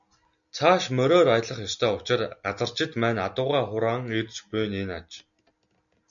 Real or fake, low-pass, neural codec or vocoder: real; 7.2 kHz; none